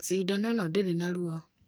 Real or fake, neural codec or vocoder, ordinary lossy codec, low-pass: fake; codec, 44.1 kHz, 2.6 kbps, SNAC; none; none